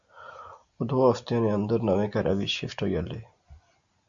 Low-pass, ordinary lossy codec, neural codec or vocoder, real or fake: 7.2 kHz; Opus, 64 kbps; none; real